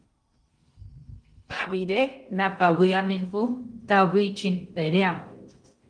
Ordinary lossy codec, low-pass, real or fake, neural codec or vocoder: Opus, 32 kbps; 9.9 kHz; fake; codec, 16 kHz in and 24 kHz out, 0.6 kbps, FocalCodec, streaming, 2048 codes